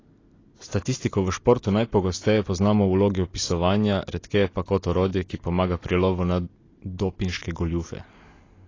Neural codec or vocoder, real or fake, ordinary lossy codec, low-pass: none; real; AAC, 32 kbps; 7.2 kHz